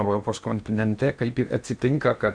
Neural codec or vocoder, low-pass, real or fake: codec, 16 kHz in and 24 kHz out, 0.8 kbps, FocalCodec, streaming, 65536 codes; 9.9 kHz; fake